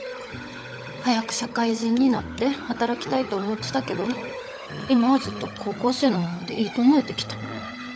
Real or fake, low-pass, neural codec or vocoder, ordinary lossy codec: fake; none; codec, 16 kHz, 16 kbps, FunCodec, trained on LibriTTS, 50 frames a second; none